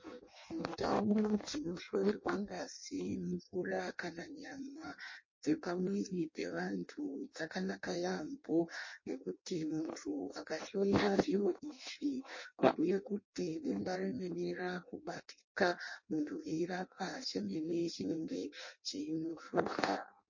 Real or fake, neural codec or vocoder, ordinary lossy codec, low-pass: fake; codec, 16 kHz in and 24 kHz out, 0.6 kbps, FireRedTTS-2 codec; MP3, 32 kbps; 7.2 kHz